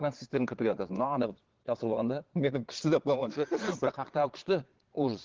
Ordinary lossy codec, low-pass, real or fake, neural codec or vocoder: Opus, 16 kbps; 7.2 kHz; fake; vocoder, 22.05 kHz, 80 mel bands, Vocos